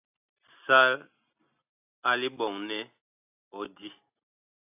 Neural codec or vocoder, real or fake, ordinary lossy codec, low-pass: none; real; AAC, 32 kbps; 3.6 kHz